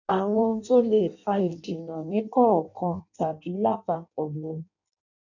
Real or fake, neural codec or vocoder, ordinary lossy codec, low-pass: fake; codec, 16 kHz in and 24 kHz out, 0.6 kbps, FireRedTTS-2 codec; none; 7.2 kHz